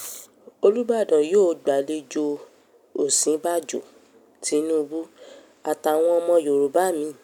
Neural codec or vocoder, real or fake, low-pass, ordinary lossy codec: none; real; 19.8 kHz; none